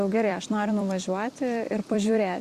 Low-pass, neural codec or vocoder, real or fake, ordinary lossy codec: 14.4 kHz; vocoder, 44.1 kHz, 128 mel bands every 256 samples, BigVGAN v2; fake; Opus, 64 kbps